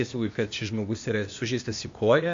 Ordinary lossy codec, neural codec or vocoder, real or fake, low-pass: MP3, 48 kbps; codec, 16 kHz, 0.8 kbps, ZipCodec; fake; 7.2 kHz